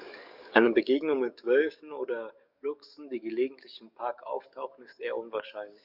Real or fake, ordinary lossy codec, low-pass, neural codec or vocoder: fake; none; 5.4 kHz; codec, 44.1 kHz, 7.8 kbps, DAC